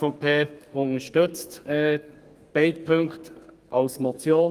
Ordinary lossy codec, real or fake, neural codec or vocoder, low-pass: Opus, 32 kbps; fake; codec, 32 kHz, 1.9 kbps, SNAC; 14.4 kHz